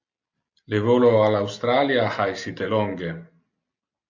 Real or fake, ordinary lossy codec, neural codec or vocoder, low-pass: real; AAC, 48 kbps; none; 7.2 kHz